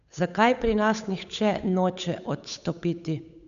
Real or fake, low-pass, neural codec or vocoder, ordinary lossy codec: fake; 7.2 kHz; codec, 16 kHz, 8 kbps, FunCodec, trained on Chinese and English, 25 frames a second; none